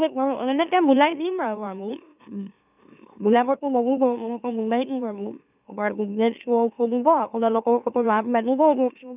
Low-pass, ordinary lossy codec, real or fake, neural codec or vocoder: 3.6 kHz; none; fake; autoencoder, 44.1 kHz, a latent of 192 numbers a frame, MeloTTS